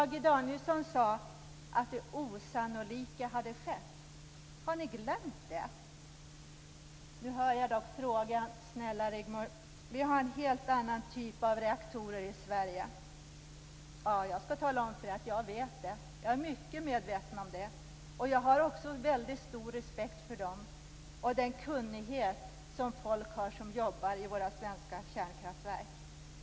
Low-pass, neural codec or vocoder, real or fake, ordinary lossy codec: none; none; real; none